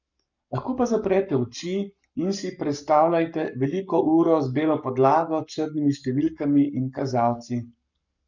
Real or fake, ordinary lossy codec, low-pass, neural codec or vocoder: fake; none; 7.2 kHz; codec, 44.1 kHz, 7.8 kbps, Pupu-Codec